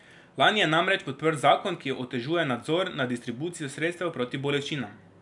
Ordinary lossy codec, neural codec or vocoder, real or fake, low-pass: none; none; real; 10.8 kHz